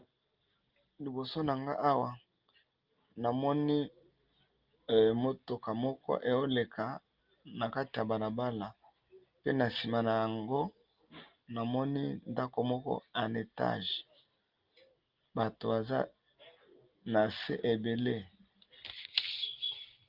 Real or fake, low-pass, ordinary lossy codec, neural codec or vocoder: real; 5.4 kHz; Opus, 32 kbps; none